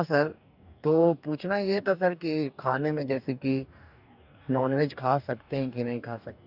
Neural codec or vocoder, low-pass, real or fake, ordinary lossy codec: codec, 44.1 kHz, 2.6 kbps, DAC; 5.4 kHz; fake; none